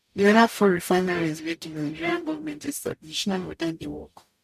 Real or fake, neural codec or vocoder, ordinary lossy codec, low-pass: fake; codec, 44.1 kHz, 0.9 kbps, DAC; none; 14.4 kHz